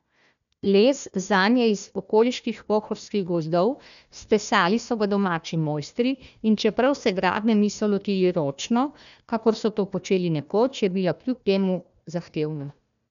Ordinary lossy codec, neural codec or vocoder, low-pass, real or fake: none; codec, 16 kHz, 1 kbps, FunCodec, trained on Chinese and English, 50 frames a second; 7.2 kHz; fake